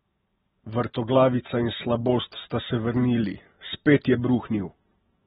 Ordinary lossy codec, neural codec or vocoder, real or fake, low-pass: AAC, 16 kbps; none; real; 7.2 kHz